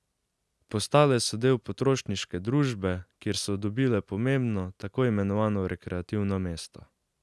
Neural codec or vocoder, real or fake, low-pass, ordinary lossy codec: none; real; none; none